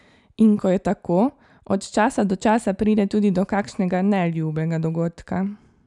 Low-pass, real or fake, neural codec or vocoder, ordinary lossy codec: 10.8 kHz; real; none; none